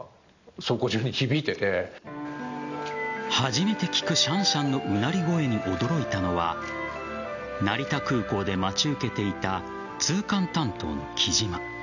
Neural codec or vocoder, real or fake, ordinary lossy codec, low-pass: none; real; none; 7.2 kHz